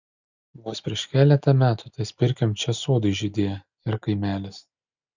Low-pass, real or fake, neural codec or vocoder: 7.2 kHz; real; none